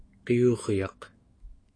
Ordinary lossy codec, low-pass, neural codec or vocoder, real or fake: AAC, 32 kbps; 9.9 kHz; autoencoder, 48 kHz, 128 numbers a frame, DAC-VAE, trained on Japanese speech; fake